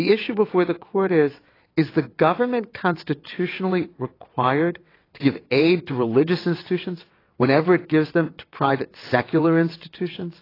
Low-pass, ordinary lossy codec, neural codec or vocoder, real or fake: 5.4 kHz; AAC, 24 kbps; vocoder, 22.05 kHz, 80 mel bands, WaveNeXt; fake